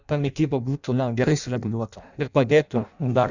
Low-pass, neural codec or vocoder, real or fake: 7.2 kHz; codec, 16 kHz in and 24 kHz out, 0.6 kbps, FireRedTTS-2 codec; fake